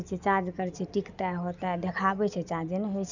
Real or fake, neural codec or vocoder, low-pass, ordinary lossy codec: real; none; 7.2 kHz; none